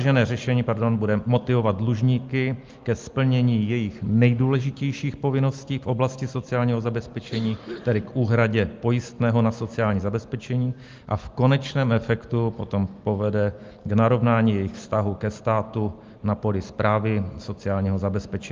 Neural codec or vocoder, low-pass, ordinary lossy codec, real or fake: none; 7.2 kHz; Opus, 32 kbps; real